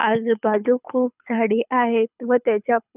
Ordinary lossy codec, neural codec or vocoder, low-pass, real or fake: none; codec, 24 kHz, 6 kbps, HILCodec; 3.6 kHz; fake